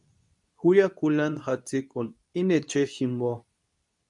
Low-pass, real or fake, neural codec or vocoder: 10.8 kHz; fake; codec, 24 kHz, 0.9 kbps, WavTokenizer, medium speech release version 2